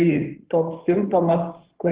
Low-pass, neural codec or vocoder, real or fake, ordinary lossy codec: 3.6 kHz; codec, 16 kHz in and 24 kHz out, 2.2 kbps, FireRedTTS-2 codec; fake; Opus, 16 kbps